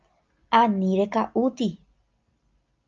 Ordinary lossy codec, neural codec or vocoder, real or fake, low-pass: Opus, 32 kbps; none; real; 7.2 kHz